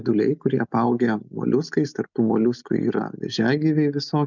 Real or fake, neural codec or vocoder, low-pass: real; none; 7.2 kHz